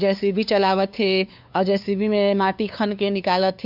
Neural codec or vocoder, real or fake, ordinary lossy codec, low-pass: codec, 16 kHz, 2 kbps, FunCodec, trained on LibriTTS, 25 frames a second; fake; none; 5.4 kHz